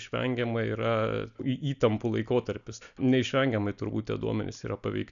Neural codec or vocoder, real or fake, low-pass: none; real; 7.2 kHz